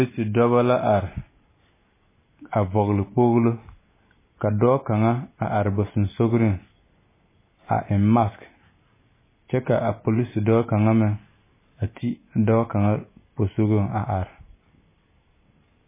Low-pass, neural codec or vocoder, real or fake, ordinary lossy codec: 3.6 kHz; none; real; MP3, 16 kbps